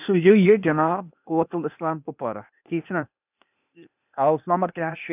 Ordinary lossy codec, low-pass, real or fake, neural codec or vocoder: none; 3.6 kHz; fake; codec, 16 kHz, 0.8 kbps, ZipCodec